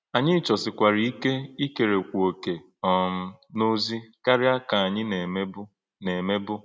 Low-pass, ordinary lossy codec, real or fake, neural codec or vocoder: none; none; real; none